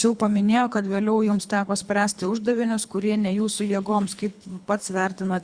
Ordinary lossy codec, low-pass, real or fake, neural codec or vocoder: Opus, 64 kbps; 9.9 kHz; fake; codec, 24 kHz, 3 kbps, HILCodec